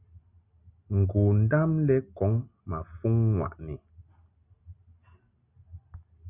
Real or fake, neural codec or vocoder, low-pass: real; none; 3.6 kHz